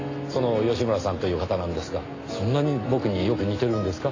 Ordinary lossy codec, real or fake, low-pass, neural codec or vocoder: AAC, 32 kbps; real; 7.2 kHz; none